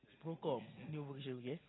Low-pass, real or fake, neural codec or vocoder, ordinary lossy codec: 7.2 kHz; real; none; AAC, 16 kbps